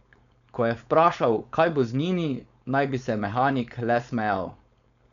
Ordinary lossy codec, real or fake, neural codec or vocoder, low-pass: none; fake; codec, 16 kHz, 4.8 kbps, FACodec; 7.2 kHz